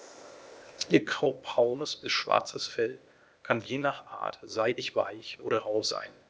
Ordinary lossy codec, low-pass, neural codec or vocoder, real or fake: none; none; codec, 16 kHz, 0.8 kbps, ZipCodec; fake